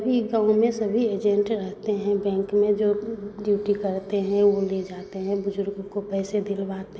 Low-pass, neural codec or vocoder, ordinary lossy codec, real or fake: none; none; none; real